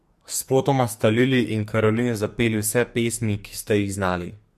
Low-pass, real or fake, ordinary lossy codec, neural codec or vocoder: 14.4 kHz; fake; MP3, 64 kbps; codec, 44.1 kHz, 2.6 kbps, SNAC